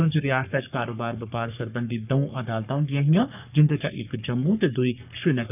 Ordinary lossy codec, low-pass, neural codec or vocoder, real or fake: none; 3.6 kHz; codec, 44.1 kHz, 3.4 kbps, Pupu-Codec; fake